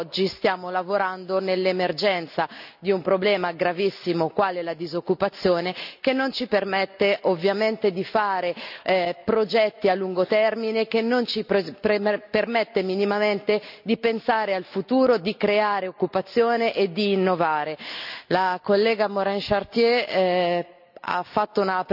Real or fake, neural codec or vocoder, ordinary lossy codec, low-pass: real; none; none; 5.4 kHz